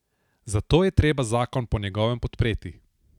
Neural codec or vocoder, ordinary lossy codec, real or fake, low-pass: none; none; real; 19.8 kHz